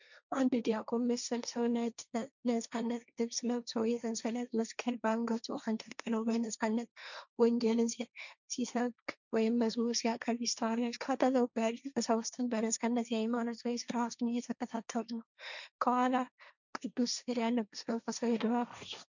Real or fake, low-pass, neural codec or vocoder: fake; 7.2 kHz; codec, 16 kHz, 1.1 kbps, Voila-Tokenizer